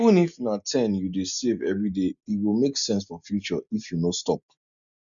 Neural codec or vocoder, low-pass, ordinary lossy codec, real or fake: none; 7.2 kHz; none; real